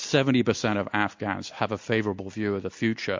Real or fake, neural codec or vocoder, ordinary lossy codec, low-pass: real; none; MP3, 48 kbps; 7.2 kHz